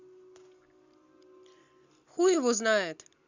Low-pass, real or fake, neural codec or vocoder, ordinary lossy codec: 7.2 kHz; real; none; Opus, 64 kbps